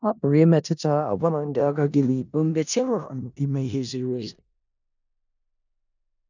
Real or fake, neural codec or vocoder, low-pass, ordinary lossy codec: fake; codec, 16 kHz in and 24 kHz out, 0.4 kbps, LongCat-Audio-Codec, four codebook decoder; 7.2 kHz; none